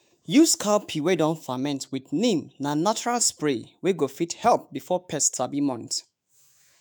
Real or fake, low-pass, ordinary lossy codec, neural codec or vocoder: fake; none; none; autoencoder, 48 kHz, 128 numbers a frame, DAC-VAE, trained on Japanese speech